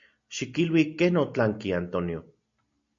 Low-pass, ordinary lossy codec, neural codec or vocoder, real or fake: 7.2 kHz; MP3, 64 kbps; none; real